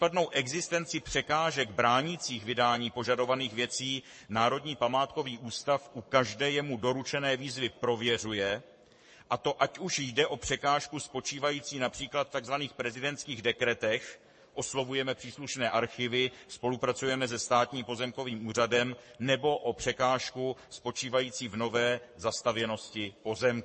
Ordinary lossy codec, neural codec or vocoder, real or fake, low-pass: MP3, 32 kbps; codec, 44.1 kHz, 7.8 kbps, Pupu-Codec; fake; 10.8 kHz